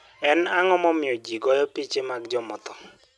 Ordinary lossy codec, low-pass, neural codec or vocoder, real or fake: none; none; none; real